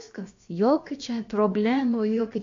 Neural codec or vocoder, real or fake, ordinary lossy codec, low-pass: codec, 16 kHz, about 1 kbps, DyCAST, with the encoder's durations; fake; AAC, 48 kbps; 7.2 kHz